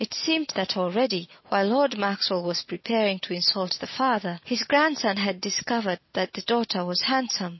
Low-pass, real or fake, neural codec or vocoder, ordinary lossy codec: 7.2 kHz; real; none; MP3, 24 kbps